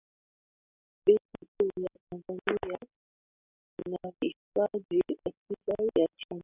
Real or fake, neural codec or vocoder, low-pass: real; none; 3.6 kHz